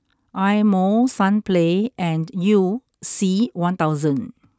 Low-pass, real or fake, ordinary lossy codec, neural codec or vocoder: none; real; none; none